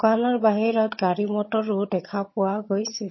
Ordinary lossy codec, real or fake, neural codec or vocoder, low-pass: MP3, 24 kbps; fake; vocoder, 22.05 kHz, 80 mel bands, HiFi-GAN; 7.2 kHz